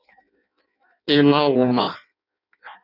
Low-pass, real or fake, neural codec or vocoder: 5.4 kHz; fake; codec, 16 kHz in and 24 kHz out, 0.6 kbps, FireRedTTS-2 codec